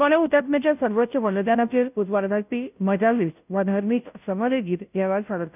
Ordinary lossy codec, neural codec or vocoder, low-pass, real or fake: none; codec, 16 kHz, 0.5 kbps, FunCodec, trained on Chinese and English, 25 frames a second; 3.6 kHz; fake